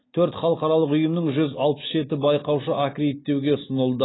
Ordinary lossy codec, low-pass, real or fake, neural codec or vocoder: AAC, 16 kbps; 7.2 kHz; real; none